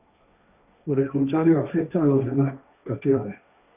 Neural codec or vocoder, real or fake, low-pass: codec, 16 kHz, 1.1 kbps, Voila-Tokenizer; fake; 3.6 kHz